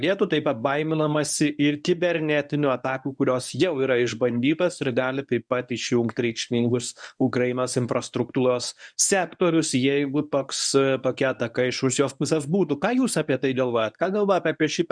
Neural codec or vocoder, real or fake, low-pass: codec, 24 kHz, 0.9 kbps, WavTokenizer, medium speech release version 1; fake; 9.9 kHz